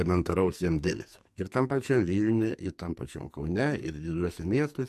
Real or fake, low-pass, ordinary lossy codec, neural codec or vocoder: fake; 14.4 kHz; MP3, 64 kbps; codec, 44.1 kHz, 2.6 kbps, SNAC